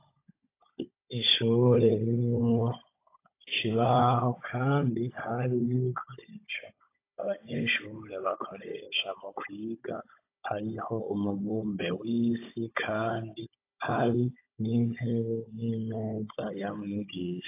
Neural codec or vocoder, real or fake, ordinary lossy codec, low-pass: codec, 16 kHz, 16 kbps, FunCodec, trained on Chinese and English, 50 frames a second; fake; AAC, 24 kbps; 3.6 kHz